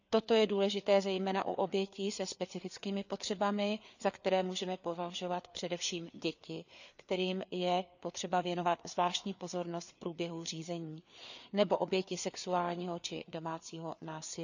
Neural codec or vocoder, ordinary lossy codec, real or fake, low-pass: codec, 16 kHz, 4 kbps, FreqCodec, larger model; none; fake; 7.2 kHz